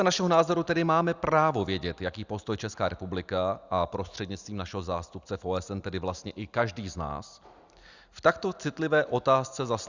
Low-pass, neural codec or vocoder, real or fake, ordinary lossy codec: 7.2 kHz; none; real; Opus, 64 kbps